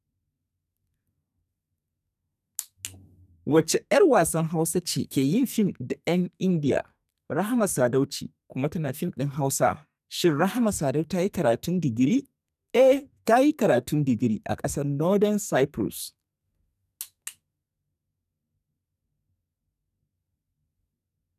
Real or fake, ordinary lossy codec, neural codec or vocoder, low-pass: fake; none; codec, 44.1 kHz, 2.6 kbps, SNAC; 14.4 kHz